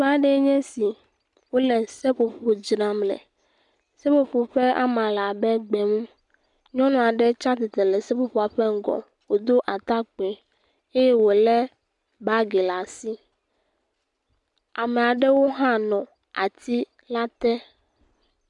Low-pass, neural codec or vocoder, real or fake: 10.8 kHz; none; real